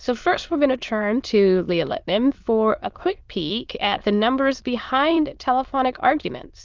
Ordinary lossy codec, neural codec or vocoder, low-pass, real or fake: Opus, 32 kbps; autoencoder, 22.05 kHz, a latent of 192 numbers a frame, VITS, trained on many speakers; 7.2 kHz; fake